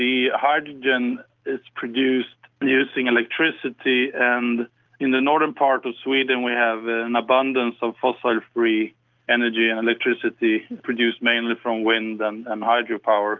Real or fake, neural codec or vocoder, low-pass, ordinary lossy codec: real; none; 7.2 kHz; Opus, 24 kbps